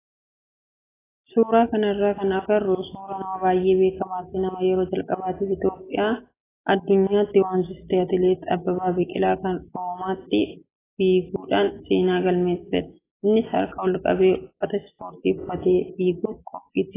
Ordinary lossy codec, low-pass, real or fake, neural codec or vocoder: AAC, 16 kbps; 3.6 kHz; real; none